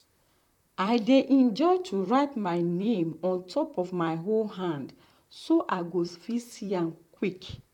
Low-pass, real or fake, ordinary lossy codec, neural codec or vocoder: 19.8 kHz; fake; none; vocoder, 44.1 kHz, 128 mel bands, Pupu-Vocoder